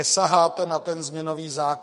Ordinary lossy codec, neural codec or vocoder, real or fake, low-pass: MP3, 48 kbps; codec, 44.1 kHz, 2.6 kbps, SNAC; fake; 14.4 kHz